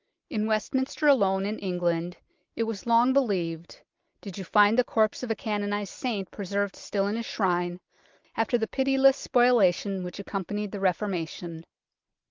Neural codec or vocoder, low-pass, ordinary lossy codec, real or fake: none; 7.2 kHz; Opus, 24 kbps; real